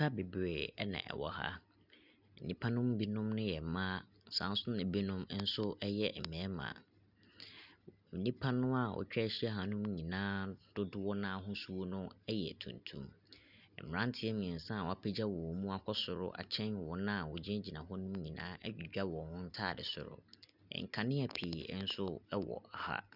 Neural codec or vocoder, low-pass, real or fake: none; 5.4 kHz; real